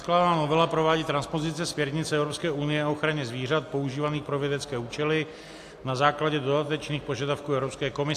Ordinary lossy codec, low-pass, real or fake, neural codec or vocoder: AAC, 64 kbps; 14.4 kHz; real; none